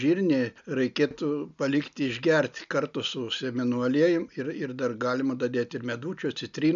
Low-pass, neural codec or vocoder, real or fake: 7.2 kHz; none; real